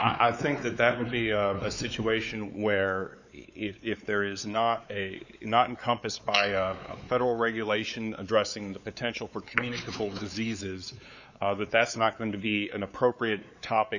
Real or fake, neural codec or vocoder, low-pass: fake; codec, 16 kHz, 4 kbps, X-Codec, WavLM features, trained on Multilingual LibriSpeech; 7.2 kHz